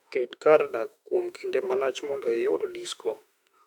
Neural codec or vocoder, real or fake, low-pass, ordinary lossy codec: autoencoder, 48 kHz, 32 numbers a frame, DAC-VAE, trained on Japanese speech; fake; 19.8 kHz; none